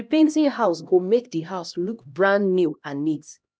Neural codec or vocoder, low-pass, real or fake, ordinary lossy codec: codec, 16 kHz, 1 kbps, X-Codec, HuBERT features, trained on LibriSpeech; none; fake; none